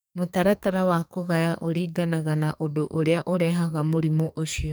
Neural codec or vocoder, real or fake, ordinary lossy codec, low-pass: codec, 44.1 kHz, 2.6 kbps, SNAC; fake; none; none